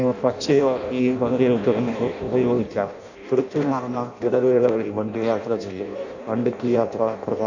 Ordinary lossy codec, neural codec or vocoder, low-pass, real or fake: none; codec, 16 kHz in and 24 kHz out, 0.6 kbps, FireRedTTS-2 codec; 7.2 kHz; fake